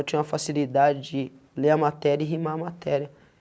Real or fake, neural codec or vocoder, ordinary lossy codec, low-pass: real; none; none; none